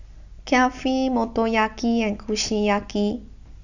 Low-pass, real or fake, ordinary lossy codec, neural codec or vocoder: 7.2 kHz; real; none; none